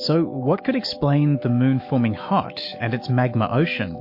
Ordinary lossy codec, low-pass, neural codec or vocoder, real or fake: MP3, 32 kbps; 5.4 kHz; autoencoder, 48 kHz, 128 numbers a frame, DAC-VAE, trained on Japanese speech; fake